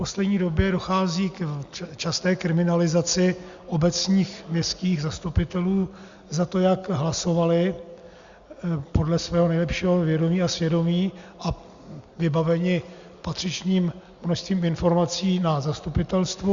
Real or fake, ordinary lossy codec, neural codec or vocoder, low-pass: real; Opus, 64 kbps; none; 7.2 kHz